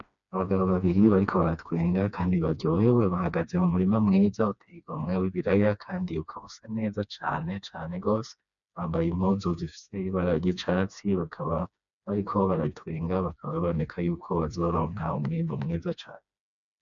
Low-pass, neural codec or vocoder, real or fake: 7.2 kHz; codec, 16 kHz, 2 kbps, FreqCodec, smaller model; fake